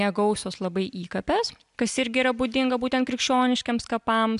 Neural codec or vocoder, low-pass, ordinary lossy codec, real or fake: none; 10.8 kHz; MP3, 96 kbps; real